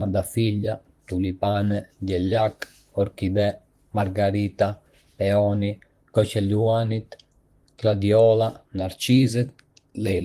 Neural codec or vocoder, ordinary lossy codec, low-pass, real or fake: vocoder, 44.1 kHz, 128 mel bands, Pupu-Vocoder; Opus, 24 kbps; 14.4 kHz; fake